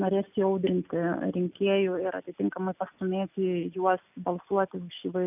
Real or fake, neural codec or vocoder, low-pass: real; none; 3.6 kHz